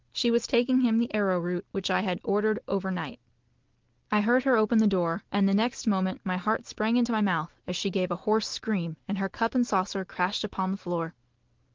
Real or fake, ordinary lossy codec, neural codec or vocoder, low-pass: real; Opus, 16 kbps; none; 7.2 kHz